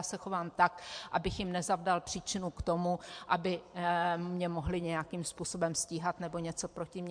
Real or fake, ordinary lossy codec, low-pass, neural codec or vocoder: fake; MP3, 64 kbps; 9.9 kHz; vocoder, 44.1 kHz, 128 mel bands every 512 samples, BigVGAN v2